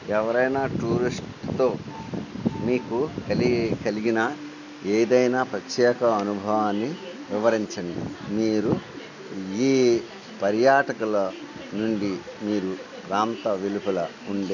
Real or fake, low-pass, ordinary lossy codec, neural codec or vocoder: real; 7.2 kHz; none; none